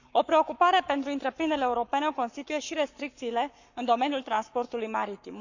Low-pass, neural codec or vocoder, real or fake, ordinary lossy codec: 7.2 kHz; codec, 44.1 kHz, 7.8 kbps, Pupu-Codec; fake; none